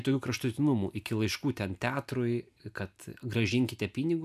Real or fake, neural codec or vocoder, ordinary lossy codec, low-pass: real; none; AAC, 96 kbps; 14.4 kHz